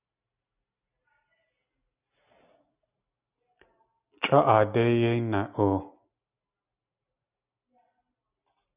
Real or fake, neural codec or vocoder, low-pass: real; none; 3.6 kHz